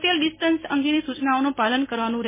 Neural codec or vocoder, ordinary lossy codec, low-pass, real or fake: none; MP3, 16 kbps; 3.6 kHz; real